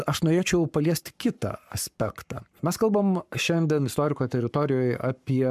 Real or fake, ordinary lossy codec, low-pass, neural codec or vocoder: fake; MP3, 96 kbps; 14.4 kHz; codec, 44.1 kHz, 7.8 kbps, Pupu-Codec